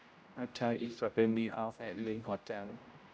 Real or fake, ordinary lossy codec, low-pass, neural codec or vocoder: fake; none; none; codec, 16 kHz, 0.5 kbps, X-Codec, HuBERT features, trained on general audio